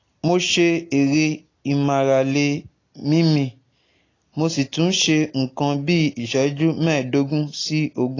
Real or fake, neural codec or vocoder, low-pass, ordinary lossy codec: real; none; 7.2 kHz; AAC, 32 kbps